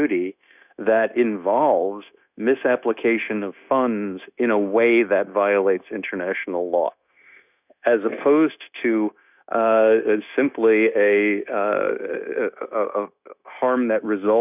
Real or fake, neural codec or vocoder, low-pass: fake; codec, 16 kHz, 0.9 kbps, LongCat-Audio-Codec; 3.6 kHz